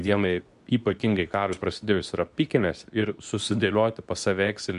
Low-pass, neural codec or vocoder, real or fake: 10.8 kHz; codec, 24 kHz, 0.9 kbps, WavTokenizer, medium speech release version 2; fake